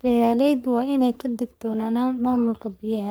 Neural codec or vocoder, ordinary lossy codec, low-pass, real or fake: codec, 44.1 kHz, 3.4 kbps, Pupu-Codec; none; none; fake